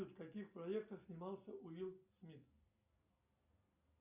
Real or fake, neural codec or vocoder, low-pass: real; none; 3.6 kHz